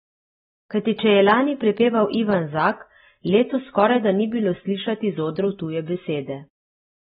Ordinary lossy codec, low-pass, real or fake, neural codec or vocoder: AAC, 16 kbps; 10.8 kHz; real; none